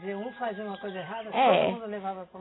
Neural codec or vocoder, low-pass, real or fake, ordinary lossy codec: none; 7.2 kHz; real; AAC, 16 kbps